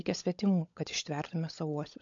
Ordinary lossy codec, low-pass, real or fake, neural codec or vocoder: MP3, 48 kbps; 7.2 kHz; fake; codec, 16 kHz, 16 kbps, FunCodec, trained on LibriTTS, 50 frames a second